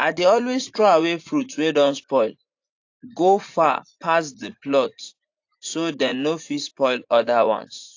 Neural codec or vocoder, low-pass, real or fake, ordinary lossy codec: vocoder, 44.1 kHz, 80 mel bands, Vocos; 7.2 kHz; fake; AAC, 48 kbps